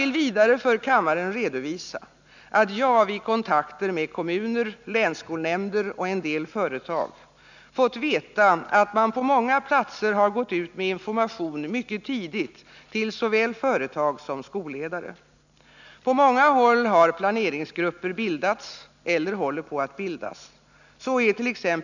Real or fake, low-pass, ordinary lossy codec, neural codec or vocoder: real; 7.2 kHz; none; none